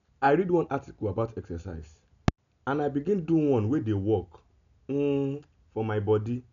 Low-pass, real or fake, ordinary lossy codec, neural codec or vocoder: 7.2 kHz; real; none; none